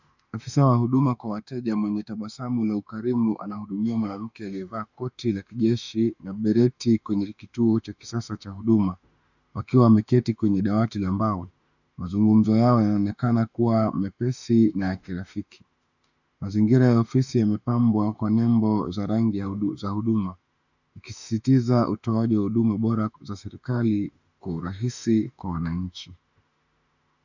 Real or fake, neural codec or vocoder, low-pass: fake; autoencoder, 48 kHz, 32 numbers a frame, DAC-VAE, trained on Japanese speech; 7.2 kHz